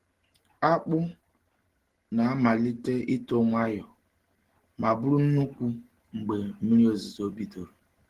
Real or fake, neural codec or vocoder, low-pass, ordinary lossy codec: real; none; 14.4 kHz; Opus, 16 kbps